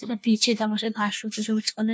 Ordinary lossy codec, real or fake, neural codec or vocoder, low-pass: none; fake; codec, 16 kHz, 1 kbps, FunCodec, trained on LibriTTS, 50 frames a second; none